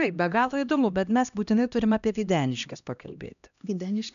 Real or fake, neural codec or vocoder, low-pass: fake; codec, 16 kHz, 1 kbps, X-Codec, HuBERT features, trained on LibriSpeech; 7.2 kHz